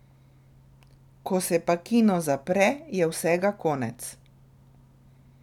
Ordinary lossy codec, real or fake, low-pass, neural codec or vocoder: none; real; 19.8 kHz; none